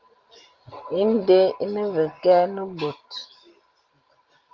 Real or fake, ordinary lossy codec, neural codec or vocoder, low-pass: real; Opus, 32 kbps; none; 7.2 kHz